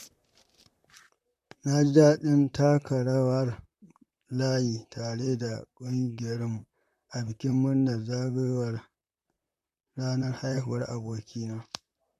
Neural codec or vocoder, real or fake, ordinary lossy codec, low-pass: vocoder, 44.1 kHz, 128 mel bands, Pupu-Vocoder; fake; MP3, 64 kbps; 14.4 kHz